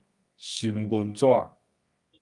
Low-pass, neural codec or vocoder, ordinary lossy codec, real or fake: 10.8 kHz; codec, 24 kHz, 0.9 kbps, WavTokenizer, medium music audio release; Opus, 32 kbps; fake